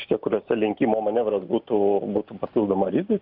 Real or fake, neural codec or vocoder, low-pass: real; none; 5.4 kHz